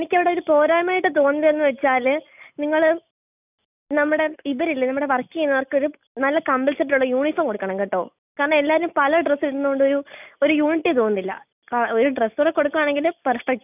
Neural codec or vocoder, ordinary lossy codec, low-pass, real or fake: none; none; 3.6 kHz; real